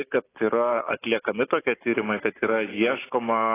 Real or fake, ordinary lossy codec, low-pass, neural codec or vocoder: real; AAC, 16 kbps; 3.6 kHz; none